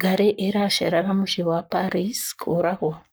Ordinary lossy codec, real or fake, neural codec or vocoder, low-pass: none; fake; codec, 44.1 kHz, 7.8 kbps, Pupu-Codec; none